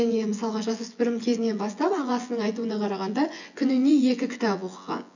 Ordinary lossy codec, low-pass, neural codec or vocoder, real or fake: none; 7.2 kHz; vocoder, 24 kHz, 100 mel bands, Vocos; fake